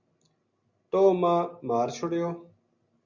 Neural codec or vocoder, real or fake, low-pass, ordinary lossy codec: none; real; 7.2 kHz; Opus, 64 kbps